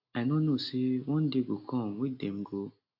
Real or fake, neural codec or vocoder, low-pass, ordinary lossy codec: real; none; 5.4 kHz; AAC, 32 kbps